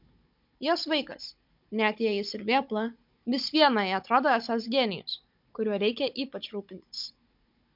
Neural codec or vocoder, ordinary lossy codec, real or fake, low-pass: codec, 16 kHz, 16 kbps, FunCodec, trained on Chinese and English, 50 frames a second; MP3, 48 kbps; fake; 5.4 kHz